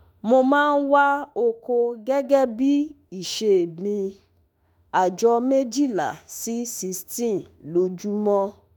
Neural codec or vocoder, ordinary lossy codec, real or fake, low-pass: autoencoder, 48 kHz, 32 numbers a frame, DAC-VAE, trained on Japanese speech; none; fake; none